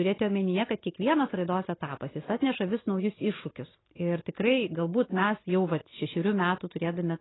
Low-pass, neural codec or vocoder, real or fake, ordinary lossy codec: 7.2 kHz; none; real; AAC, 16 kbps